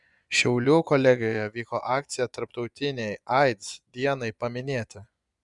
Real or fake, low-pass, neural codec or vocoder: fake; 10.8 kHz; vocoder, 24 kHz, 100 mel bands, Vocos